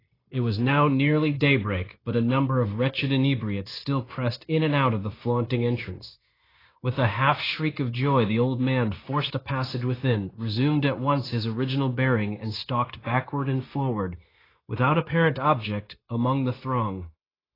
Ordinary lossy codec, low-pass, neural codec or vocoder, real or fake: AAC, 24 kbps; 5.4 kHz; codec, 16 kHz, 0.9 kbps, LongCat-Audio-Codec; fake